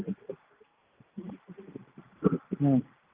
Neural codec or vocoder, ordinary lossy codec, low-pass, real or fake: none; Opus, 24 kbps; 3.6 kHz; real